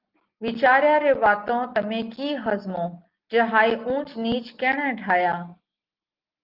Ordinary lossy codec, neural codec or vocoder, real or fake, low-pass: Opus, 32 kbps; none; real; 5.4 kHz